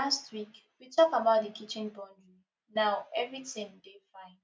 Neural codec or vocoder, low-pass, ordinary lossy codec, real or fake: none; none; none; real